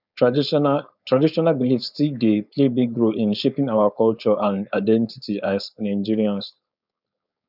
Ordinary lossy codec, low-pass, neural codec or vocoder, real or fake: none; 5.4 kHz; codec, 16 kHz, 4.8 kbps, FACodec; fake